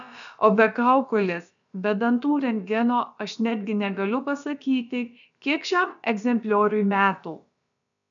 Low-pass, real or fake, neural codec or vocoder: 7.2 kHz; fake; codec, 16 kHz, about 1 kbps, DyCAST, with the encoder's durations